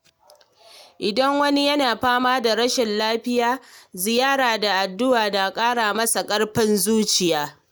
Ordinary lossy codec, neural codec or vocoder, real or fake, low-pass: none; none; real; none